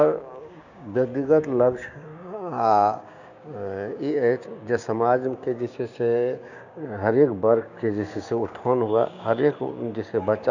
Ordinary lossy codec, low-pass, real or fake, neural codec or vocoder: none; 7.2 kHz; fake; codec, 16 kHz, 6 kbps, DAC